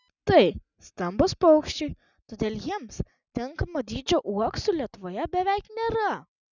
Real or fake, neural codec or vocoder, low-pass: real; none; 7.2 kHz